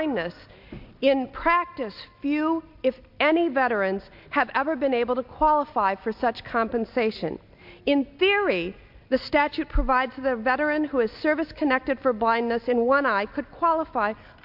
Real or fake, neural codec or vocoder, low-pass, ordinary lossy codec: real; none; 5.4 kHz; MP3, 48 kbps